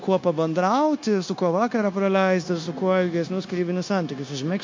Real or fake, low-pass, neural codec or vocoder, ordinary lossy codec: fake; 7.2 kHz; codec, 16 kHz, 0.9 kbps, LongCat-Audio-Codec; MP3, 48 kbps